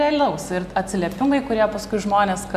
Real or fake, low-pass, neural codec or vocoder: real; 14.4 kHz; none